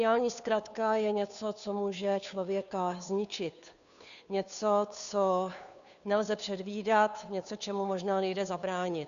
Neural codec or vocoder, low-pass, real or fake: codec, 16 kHz, 2 kbps, FunCodec, trained on Chinese and English, 25 frames a second; 7.2 kHz; fake